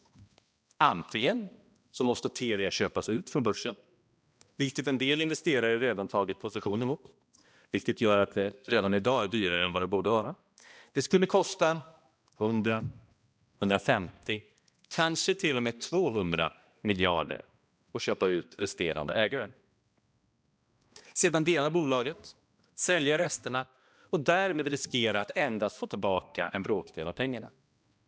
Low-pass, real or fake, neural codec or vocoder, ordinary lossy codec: none; fake; codec, 16 kHz, 1 kbps, X-Codec, HuBERT features, trained on balanced general audio; none